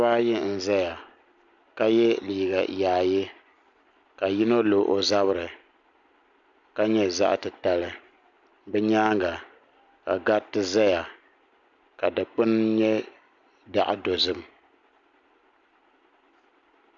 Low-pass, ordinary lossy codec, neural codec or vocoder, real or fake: 7.2 kHz; MP3, 64 kbps; none; real